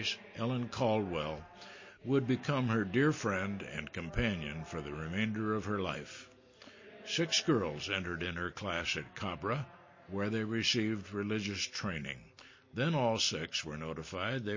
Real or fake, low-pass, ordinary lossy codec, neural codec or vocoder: real; 7.2 kHz; MP3, 32 kbps; none